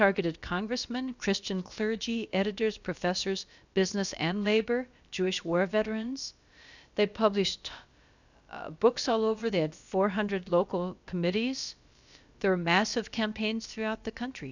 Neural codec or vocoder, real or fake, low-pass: codec, 16 kHz, about 1 kbps, DyCAST, with the encoder's durations; fake; 7.2 kHz